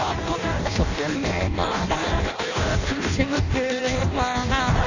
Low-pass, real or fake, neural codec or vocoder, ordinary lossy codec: 7.2 kHz; fake; codec, 16 kHz in and 24 kHz out, 0.6 kbps, FireRedTTS-2 codec; none